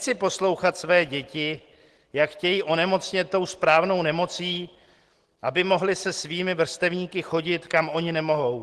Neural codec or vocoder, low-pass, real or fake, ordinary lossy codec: none; 10.8 kHz; real; Opus, 16 kbps